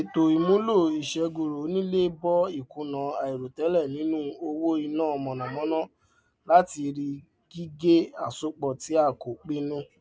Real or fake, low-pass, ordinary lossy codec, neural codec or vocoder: real; none; none; none